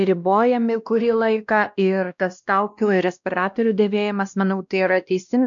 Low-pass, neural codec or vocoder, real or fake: 7.2 kHz; codec, 16 kHz, 1 kbps, X-Codec, WavLM features, trained on Multilingual LibriSpeech; fake